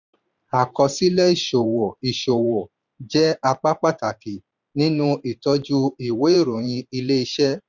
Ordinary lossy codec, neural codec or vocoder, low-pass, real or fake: none; vocoder, 44.1 kHz, 128 mel bands every 512 samples, BigVGAN v2; 7.2 kHz; fake